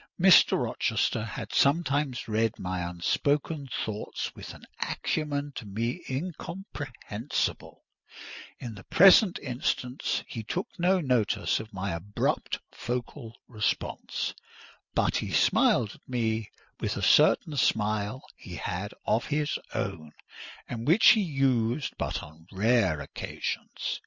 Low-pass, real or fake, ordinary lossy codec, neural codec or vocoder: 7.2 kHz; real; Opus, 64 kbps; none